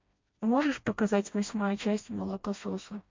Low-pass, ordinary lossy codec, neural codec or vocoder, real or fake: 7.2 kHz; MP3, 48 kbps; codec, 16 kHz, 1 kbps, FreqCodec, smaller model; fake